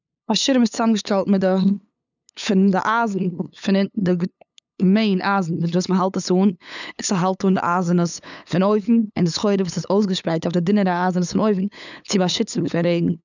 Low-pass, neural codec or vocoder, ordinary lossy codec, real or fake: 7.2 kHz; codec, 16 kHz, 8 kbps, FunCodec, trained on LibriTTS, 25 frames a second; none; fake